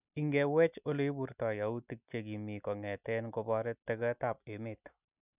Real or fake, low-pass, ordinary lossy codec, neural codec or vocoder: real; 3.6 kHz; none; none